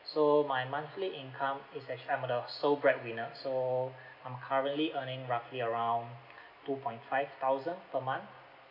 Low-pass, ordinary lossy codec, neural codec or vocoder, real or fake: 5.4 kHz; none; none; real